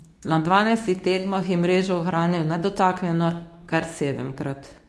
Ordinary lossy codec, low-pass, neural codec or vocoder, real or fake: none; none; codec, 24 kHz, 0.9 kbps, WavTokenizer, medium speech release version 2; fake